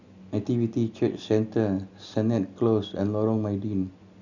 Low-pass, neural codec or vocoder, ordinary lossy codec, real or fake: 7.2 kHz; none; none; real